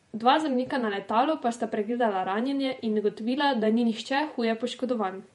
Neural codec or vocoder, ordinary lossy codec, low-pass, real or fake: vocoder, 48 kHz, 128 mel bands, Vocos; MP3, 48 kbps; 19.8 kHz; fake